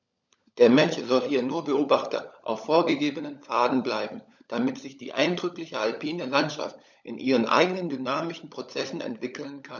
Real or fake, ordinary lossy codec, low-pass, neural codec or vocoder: fake; none; 7.2 kHz; codec, 16 kHz, 16 kbps, FunCodec, trained on LibriTTS, 50 frames a second